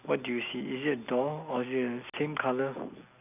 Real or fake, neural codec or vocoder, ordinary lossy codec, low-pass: real; none; none; 3.6 kHz